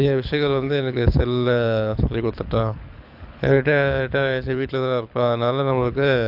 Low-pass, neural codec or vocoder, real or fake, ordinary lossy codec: 5.4 kHz; codec, 24 kHz, 6 kbps, HILCodec; fake; AAC, 48 kbps